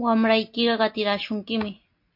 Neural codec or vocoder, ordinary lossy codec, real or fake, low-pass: none; MP3, 32 kbps; real; 5.4 kHz